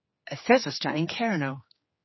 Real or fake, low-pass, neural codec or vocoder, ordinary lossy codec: fake; 7.2 kHz; codec, 16 kHz, 4 kbps, X-Codec, HuBERT features, trained on general audio; MP3, 24 kbps